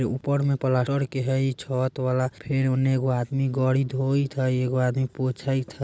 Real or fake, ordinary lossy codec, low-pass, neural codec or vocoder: real; none; none; none